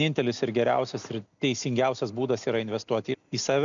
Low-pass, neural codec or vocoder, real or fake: 7.2 kHz; none; real